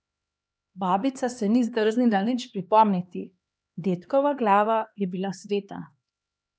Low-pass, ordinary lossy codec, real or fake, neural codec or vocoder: none; none; fake; codec, 16 kHz, 2 kbps, X-Codec, HuBERT features, trained on LibriSpeech